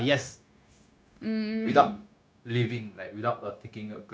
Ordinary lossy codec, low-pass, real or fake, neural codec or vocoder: none; none; fake; codec, 16 kHz, 0.9 kbps, LongCat-Audio-Codec